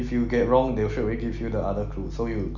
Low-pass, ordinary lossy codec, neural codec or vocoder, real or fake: 7.2 kHz; none; none; real